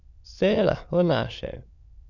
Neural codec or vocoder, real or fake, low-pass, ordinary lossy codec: autoencoder, 22.05 kHz, a latent of 192 numbers a frame, VITS, trained on many speakers; fake; 7.2 kHz; none